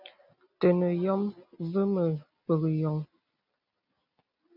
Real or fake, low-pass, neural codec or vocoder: real; 5.4 kHz; none